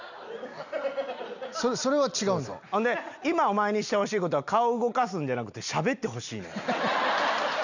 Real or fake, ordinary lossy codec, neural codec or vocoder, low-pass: real; none; none; 7.2 kHz